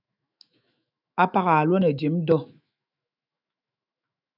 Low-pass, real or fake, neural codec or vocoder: 5.4 kHz; fake; autoencoder, 48 kHz, 128 numbers a frame, DAC-VAE, trained on Japanese speech